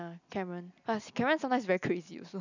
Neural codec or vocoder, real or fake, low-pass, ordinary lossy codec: none; real; 7.2 kHz; none